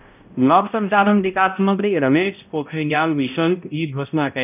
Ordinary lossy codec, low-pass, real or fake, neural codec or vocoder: none; 3.6 kHz; fake; codec, 16 kHz, 0.5 kbps, X-Codec, HuBERT features, trained on balanced general audio